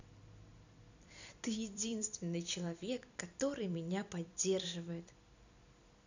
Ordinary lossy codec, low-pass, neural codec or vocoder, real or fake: none; 7.2 kHz; vocoder, 44.1 kHz, 128 mel bands every 256 samples, BigVGAN v2; fake